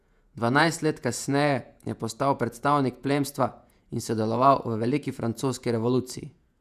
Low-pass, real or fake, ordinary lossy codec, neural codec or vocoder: 14.4 kHz; fake; none; vocoder, 48 kHz, 128 mel bands, Vocos